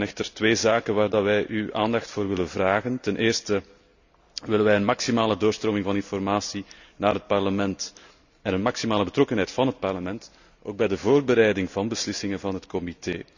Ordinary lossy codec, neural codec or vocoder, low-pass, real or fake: none; none; 7.2 kHz; real